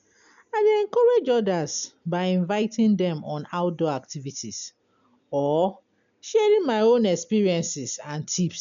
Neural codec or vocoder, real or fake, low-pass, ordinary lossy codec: none; real; 7.2 kHz; none